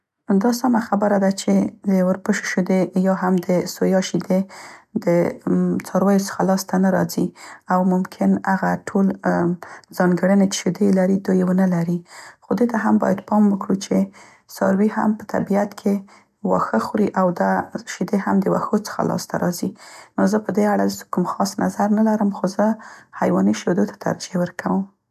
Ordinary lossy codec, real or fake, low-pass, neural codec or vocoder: none; real; 14.4 kHz; none